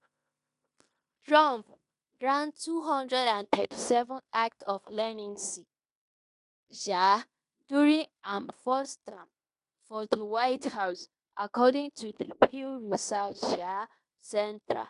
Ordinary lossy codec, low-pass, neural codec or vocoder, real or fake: AAC, 96 kbps; 10.8 kHz; codec, 16 kHz in and 24 kHz out, 0.9 kbps, LongCat-Audio-Codec, fine tuned four codebook decoder; fake